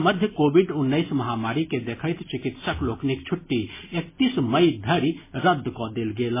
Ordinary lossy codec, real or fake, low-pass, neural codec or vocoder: MP3, 16 kbps; real; 3.6 kHz; none